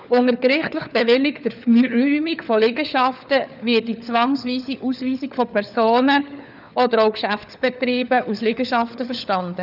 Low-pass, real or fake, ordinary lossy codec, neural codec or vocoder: 5.4 kHz; fake; none; codec, 16 kHz, 4 kbps, FunCodec, trained on LibriTTS, 50 frames a second